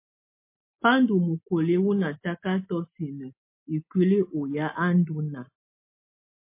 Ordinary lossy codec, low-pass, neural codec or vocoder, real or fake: MP3, 24 kbps; 3.6 kHz; none; real